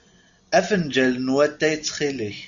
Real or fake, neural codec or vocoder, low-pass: real; none; 7.2 kHz